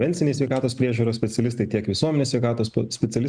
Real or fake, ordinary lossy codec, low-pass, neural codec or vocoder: real; MP3, 96 kbps; 9.9 kHz; none